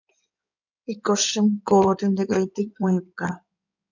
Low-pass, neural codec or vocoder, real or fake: 7.2 kHz; codec, 16 kHz in and 24 kHz out, 2.2 kbps, FireRedTTS-2 codec; fake